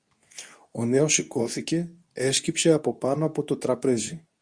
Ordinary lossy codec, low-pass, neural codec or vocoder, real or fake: Opus, 64 kbps; 9.9 kHz; codec, 24 kHz, 0.9 kbps, WavTokenizer, medium speech release version 2; fake